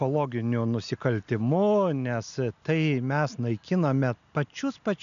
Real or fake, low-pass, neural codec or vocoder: real; 7.2 kHz; none